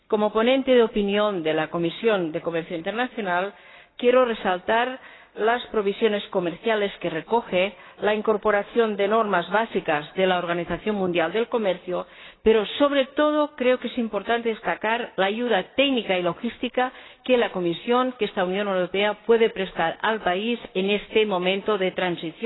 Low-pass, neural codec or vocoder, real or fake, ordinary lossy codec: 7.2 kHz; codec, 16 kHz, 6 kbps, DAC; fake; AAC, 16 kbps